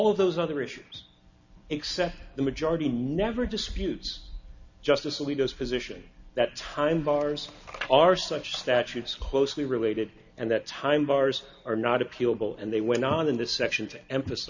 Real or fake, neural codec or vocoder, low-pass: real; none; 7.2 kHz